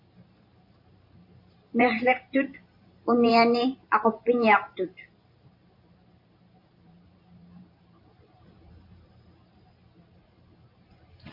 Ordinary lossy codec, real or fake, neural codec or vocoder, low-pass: MP3, 32 kbps; fake; vocoder, 44.1 kHz, 128 mel bands every 512 samples, BigVGAN v2; 5.4 kHz